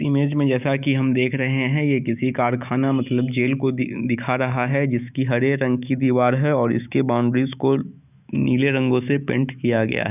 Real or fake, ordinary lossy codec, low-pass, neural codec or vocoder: fake; none; 3.6 kHz; autoencoder, 48 kHz, 128 numbers a frame, DAC-VAE, trained on Japanese speech